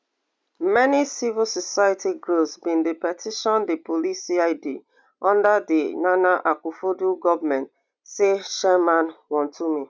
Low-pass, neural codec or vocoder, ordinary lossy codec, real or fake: none; none; none; real